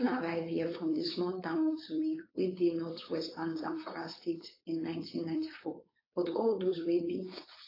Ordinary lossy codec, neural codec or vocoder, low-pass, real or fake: AAC, 24 kbps; codec, 16 kHz, 4.8 kbps, FACodec; 5.4 kHz; fake